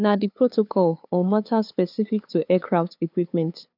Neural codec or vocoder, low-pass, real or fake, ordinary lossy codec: codec, 16 kHz, 2 kbps, FunCodec, trained on LibriTTS, 25 frames a second; 5.4 kHz; fake; none